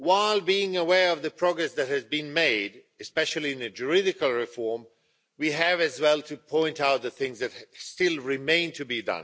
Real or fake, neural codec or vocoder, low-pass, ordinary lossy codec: real; none; none; none